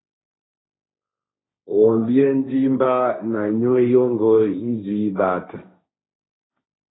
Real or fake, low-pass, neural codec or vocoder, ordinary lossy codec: fake; 7.2 kHz; codec, 16 kHz, 1.1 kbps, Voila-Tokenizer; AAC, 16 kbps